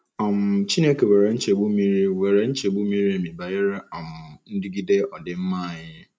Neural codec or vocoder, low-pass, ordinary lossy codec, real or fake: none; none; none; real